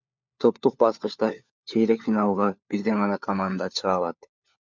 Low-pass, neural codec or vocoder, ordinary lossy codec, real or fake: 7.2 kHz; codec, 16 kHz, 4 kbps, FunCodec, trained on LibriTTS, 50 frames a second; MP3, 64 kbps; fake